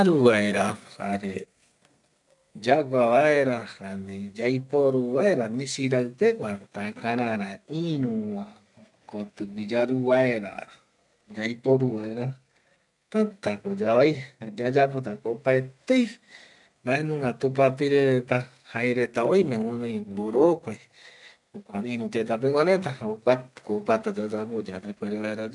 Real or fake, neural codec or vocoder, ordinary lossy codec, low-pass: fake; codec, 32 kHz, 1.9 kbps, SNAC; none; 10.8 kHz